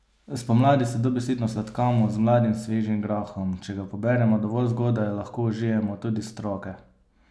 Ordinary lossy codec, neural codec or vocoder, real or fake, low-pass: none; none; real; none